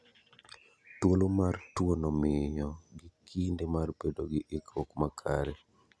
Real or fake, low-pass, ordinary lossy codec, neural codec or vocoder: real; none; none; none